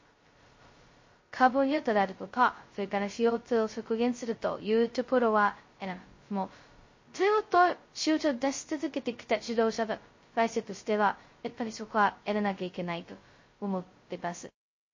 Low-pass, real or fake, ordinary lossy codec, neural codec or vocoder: 7.2 kHz; fake; MP3, 32 kbps; codec, 16 kHz, 0.2 kbps, FocalCodec